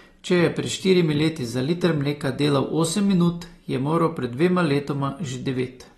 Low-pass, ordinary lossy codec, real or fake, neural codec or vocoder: 19.8 kHz; AAC, 32 kbps; real; none